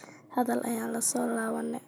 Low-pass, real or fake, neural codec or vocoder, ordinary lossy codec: none; real; none; none